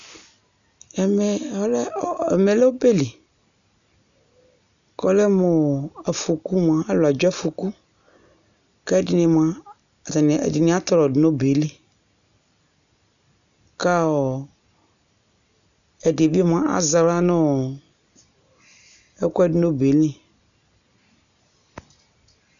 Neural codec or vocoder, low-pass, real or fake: none; 7.2 kHz; real